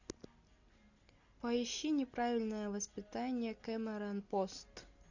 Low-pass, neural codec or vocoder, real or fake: 7.2 kHz; none; real